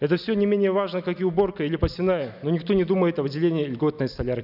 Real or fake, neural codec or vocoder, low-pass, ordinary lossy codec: real; none; 5.4 kHz; none